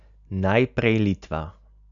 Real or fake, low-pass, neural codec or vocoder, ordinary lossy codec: real; 7.2 kHz; none; none